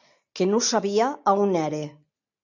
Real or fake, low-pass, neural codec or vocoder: real; 7.2 kHz; none